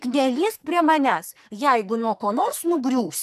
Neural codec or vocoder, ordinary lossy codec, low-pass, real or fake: codec, 44.1 kHz, 2.6 kbps, SNAC; MP3, 96 kbps; 14.4 kHz; fake